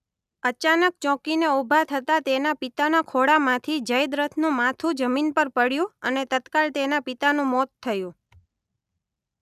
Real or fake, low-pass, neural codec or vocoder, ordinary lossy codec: real; 14.4 kHz; none; none